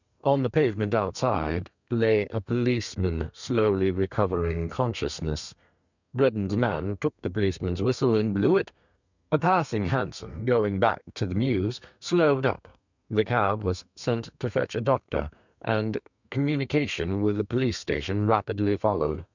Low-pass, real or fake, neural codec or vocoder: 7.2 kHz; fake; codec, 32 kHz, 1.9 kbps, SNAC